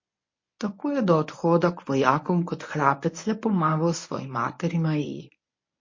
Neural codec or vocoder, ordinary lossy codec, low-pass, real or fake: codec, 24 kHz, 0.9 kbps, WavTokenizer, medium speech release version 1; MP3, 32 kbps; 7.2 kHz; fake